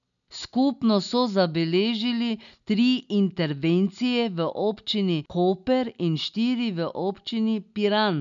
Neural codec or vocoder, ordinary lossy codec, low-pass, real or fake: none; none; 7.2 kHz; real